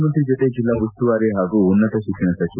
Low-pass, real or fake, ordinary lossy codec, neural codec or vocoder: 3.6 kHz; real; none; none